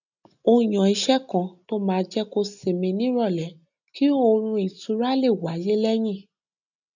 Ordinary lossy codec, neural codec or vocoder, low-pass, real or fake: none; none; 7.2 kHz; real